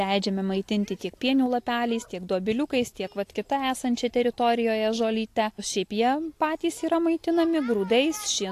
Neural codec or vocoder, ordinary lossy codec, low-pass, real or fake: none; AAC, 64 kbps; 14.4 kHz; real